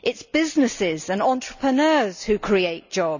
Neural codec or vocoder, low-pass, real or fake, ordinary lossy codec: none; 7.2 kHz; real; none